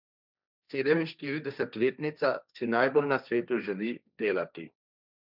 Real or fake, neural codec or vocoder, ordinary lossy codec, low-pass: fake; codec, 16 kHz, 1.1 kbps, Voila-Tokenizer; none; 5.4 kHz